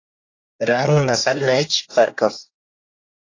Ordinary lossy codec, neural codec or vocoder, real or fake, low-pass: AAC, 32 kbps; codec, 24 kHz, 1 kbps, SNAC; fake; 7.2 kHz